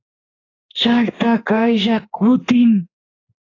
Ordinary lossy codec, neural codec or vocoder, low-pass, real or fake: AAC, 32 kbps; codec, 16 kHz, 2 kbps, X-Codec, HuBERT features, trained on general audio; 7.2 kHz; fake